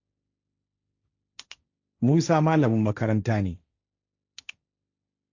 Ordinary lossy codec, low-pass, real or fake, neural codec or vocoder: Opus, 64 kbps; 7.2 kHz; fake; codec, 16 kHz, 1.1 kbps, Voila-Tokenizer